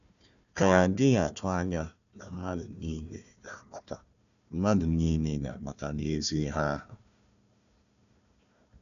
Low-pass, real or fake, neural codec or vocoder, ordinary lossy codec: 7.2 kHz; fake; codec, 16 kHz, 1 kbps, FunCodec, trained on Chinese and English, 50 frames a second; none